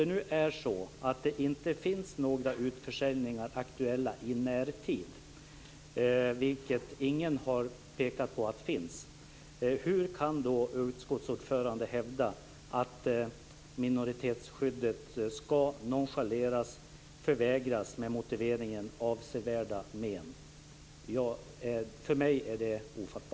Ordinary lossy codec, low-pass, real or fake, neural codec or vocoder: none; none; real; none